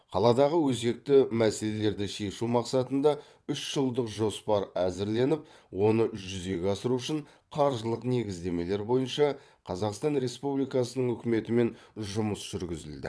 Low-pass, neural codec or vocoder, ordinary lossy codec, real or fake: none; vocoder, 22.05 kHz, 80 mel bands, WaveNeXt; none; fake